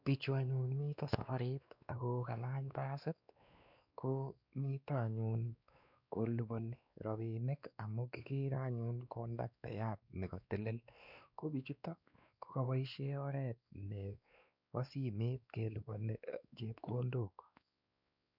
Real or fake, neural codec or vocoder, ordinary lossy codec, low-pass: fake; codec, 16 kHz, 2 kbps, X-Codec, WavLM features, trained on Multilingual LibriSpeech; none; 5.4 kHz